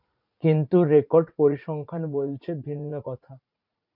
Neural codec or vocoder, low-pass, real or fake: vocoder, 44.1 kHz, 80 mel bands, Vocos; 5.4 kHz; fake